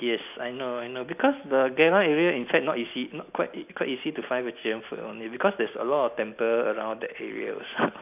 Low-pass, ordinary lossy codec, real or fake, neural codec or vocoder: 3.6 kHz; none; real; none